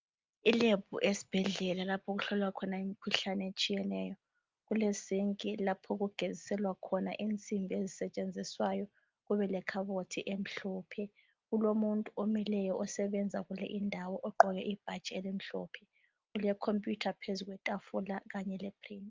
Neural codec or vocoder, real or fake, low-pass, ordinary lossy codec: none; real; 7.2 kHz; Opus, 24 kbps